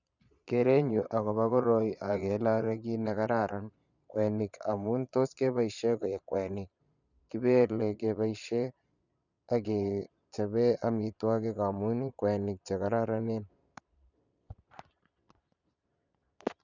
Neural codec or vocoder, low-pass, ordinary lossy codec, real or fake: vocoder, 22.05 kHz, 80 mel bands, Vocos; 7.2 kHz; none; fake